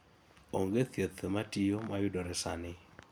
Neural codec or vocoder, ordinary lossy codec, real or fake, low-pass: none; none; real; none